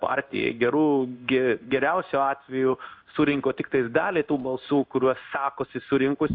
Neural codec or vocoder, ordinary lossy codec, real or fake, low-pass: codec, 16 kHz in and 24 kHz out, 1 kbps, XY-Tokenizer; AAC, 48 kbps; fake; 5.4 kHz